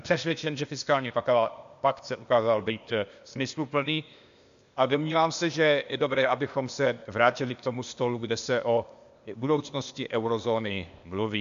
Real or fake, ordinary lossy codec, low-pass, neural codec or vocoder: fake; MP3, 64 kbps; 7.2 kHz; codec, 16 kHz, 0.8 kbps, ZipCodec